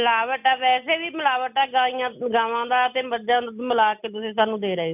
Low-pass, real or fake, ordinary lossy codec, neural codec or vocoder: 3.6 kHz; real; none; none